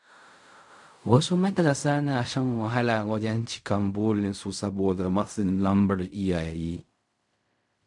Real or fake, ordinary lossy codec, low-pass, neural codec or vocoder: fake; none; 10.8 kHz; codec, 16 kHz in and 24 kHz out, 0.4 kbps, LongCat-Audio-Codec, fine tuned four codebook decoder